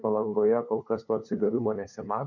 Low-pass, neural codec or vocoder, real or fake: 7.2 kHz; codec, 16 kHz, 2 kbps, FunCodec, trained on LibriTTS, 25 frames a second; fake